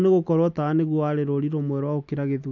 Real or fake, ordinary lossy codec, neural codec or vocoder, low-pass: real; none; none; 7.2 kHz